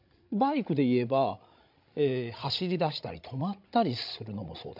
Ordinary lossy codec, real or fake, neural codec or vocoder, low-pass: MP3, 48 kbps; fake; codec, 16 kHz, 16 kbps, FreqCodec, larger model; 5.4 kHz